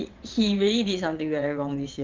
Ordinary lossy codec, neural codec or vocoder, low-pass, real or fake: Opus, 24 kbps; vocoder, 22.05 kHz, 80 mel bands, WaveNeXt; 7.2 kHz; fake